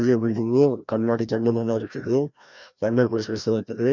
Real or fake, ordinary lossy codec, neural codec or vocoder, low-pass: fake; none; codec, 16 kHz, 1 kbps, FreqCodec, larger model; 7.2 kHz